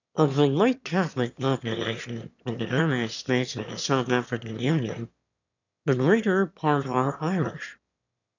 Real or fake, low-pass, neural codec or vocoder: fake; 7.2 kHz; autoencoder, 22.05 kHz, a latent of 192 numbers a frame, VITS, trained on one speaker